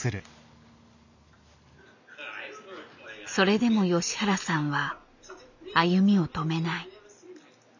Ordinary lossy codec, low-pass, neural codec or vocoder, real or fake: none; 7.2 kHz; none; real